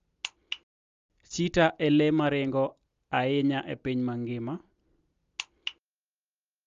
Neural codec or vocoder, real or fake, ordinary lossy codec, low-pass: none; real; Opus, 32 kbps; 7.2 kHz